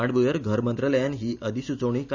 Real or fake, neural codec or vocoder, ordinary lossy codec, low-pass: real; none; none; 7.2 kHz